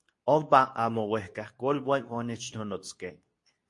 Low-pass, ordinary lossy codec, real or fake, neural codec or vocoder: 10.8 kHz; MP3, 48 kbps; fake; codec, 24 kHz, 0.9 kbps, WavTokenizer, medium speech release version 2